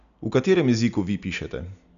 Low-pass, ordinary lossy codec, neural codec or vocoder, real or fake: 7.2 kHz; none; none; real